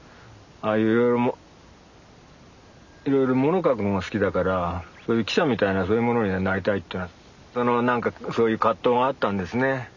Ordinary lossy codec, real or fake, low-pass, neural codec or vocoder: none; real; 7.2 kHz; none